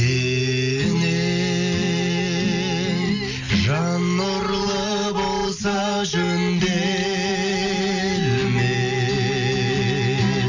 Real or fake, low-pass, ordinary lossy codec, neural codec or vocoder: real; 7.2 kHz; none; none